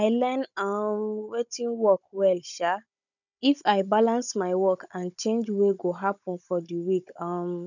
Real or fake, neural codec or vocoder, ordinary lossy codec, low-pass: fake; codec, 16 kHz, 16 kbps, FunCodec, trained on Chinese and English, 50 frames a second; none; 7.2 kHz